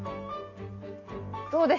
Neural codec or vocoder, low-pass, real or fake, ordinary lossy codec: none; 7.2 kHz; real; none